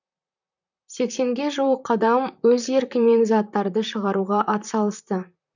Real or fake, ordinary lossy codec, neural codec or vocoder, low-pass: fake; none; vocoder, 44.1 kHz, 128 mel bands, Pupu-Vocoder; 7.2 kHz